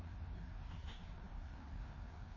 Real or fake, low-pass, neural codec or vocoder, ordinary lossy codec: fake; 7.2 kHz; codec, 16 kHz, 2 kbps, FreqCodec, larger model; MP3, 32 kbps